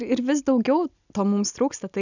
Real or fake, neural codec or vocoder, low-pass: real; none; 7.2 kHz